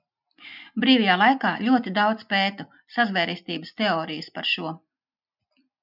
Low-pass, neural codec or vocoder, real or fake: 5.4 kHz; none; real